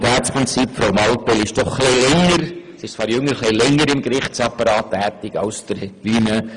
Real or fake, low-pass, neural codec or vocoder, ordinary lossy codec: real; 10.8 kHz; none; Opus, 32 kbps